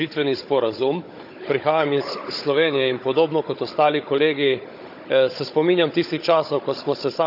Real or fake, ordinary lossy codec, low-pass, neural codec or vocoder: fake; none; 5.4 kHz; codec, 16 kHz, 16 kbps, FunCodec, trained on Chinese and English, 50 frames a second